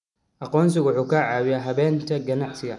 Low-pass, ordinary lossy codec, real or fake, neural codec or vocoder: 10.8 kHz; none; real; none